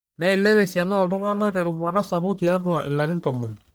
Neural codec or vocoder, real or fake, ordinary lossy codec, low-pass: codec, 44.1 kHz, 1.7 kbps, Pupu-Codec; fake; none; none